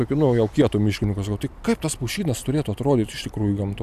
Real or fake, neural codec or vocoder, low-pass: real; none; 14.4 kHz